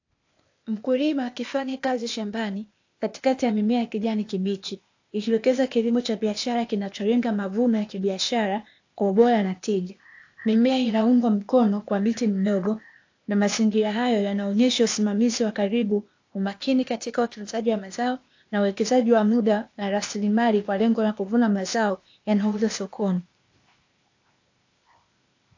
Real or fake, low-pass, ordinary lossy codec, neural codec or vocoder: fake; 7.2 kHz; AAC, 48 kbps; codec, 16 kHz, 0.8 kbps, ZipCodec